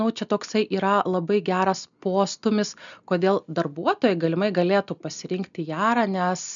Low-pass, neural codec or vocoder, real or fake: 7.2 kHz; none; real